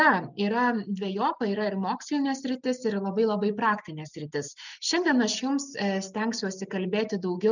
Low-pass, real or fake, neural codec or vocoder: 7.2 kHz; real; none